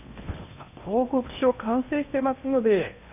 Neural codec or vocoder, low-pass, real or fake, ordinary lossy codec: codec, 16 kHz in and 24 kHz out, 0.8 kbps, FocalCodec, streaming, 65536 codes; 3.6 kHz; fake; none